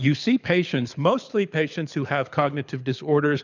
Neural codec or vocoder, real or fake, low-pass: codec, 24 kHz, 6 kbps, HILCodec; fake; 7.2 kHz